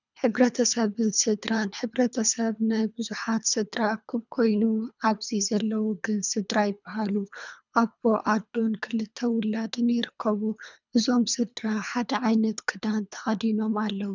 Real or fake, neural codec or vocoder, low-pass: fake; codec, 24 kHz, 3 kbps, HILCodec; 7.2 kHz